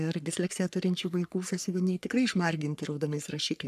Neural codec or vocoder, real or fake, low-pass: codec, 44.1 kHz, 3.4 kbps, Pupu-Codec; fake; 14.4 kHz